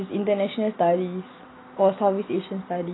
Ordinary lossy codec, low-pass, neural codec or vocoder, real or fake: AAC, 16 kbps; 7.2 kHz; none; real